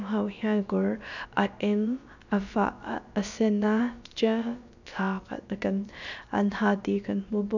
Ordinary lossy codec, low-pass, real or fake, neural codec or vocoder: none; 7.2 kHz; fake; codec, 16 kHz, about 1 kbps, DyCAST, with the encoder's durations